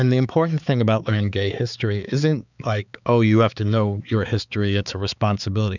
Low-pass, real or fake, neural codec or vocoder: 7.2 kHz; fake; codec, 16 kHz, 4 kbps, X-Codec, HuBERT features, trained on balanced general audio